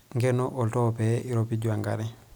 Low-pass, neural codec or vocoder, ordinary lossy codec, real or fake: none; none; none; real